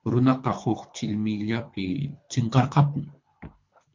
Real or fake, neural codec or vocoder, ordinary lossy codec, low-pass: fake; codec, 24 kHz, 3 kbps, HILCodec; MP3, 48 kbps; 7.2 kHz